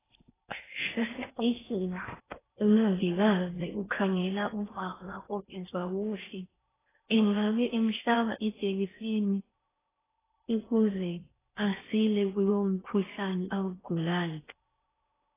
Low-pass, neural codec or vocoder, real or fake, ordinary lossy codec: 3.6 kHz; codec, 16 kHz in and 24 kHz out, 0.6 kbps, FocalCodec, streaming, 4096 codes; fake; AAC, 16 kbps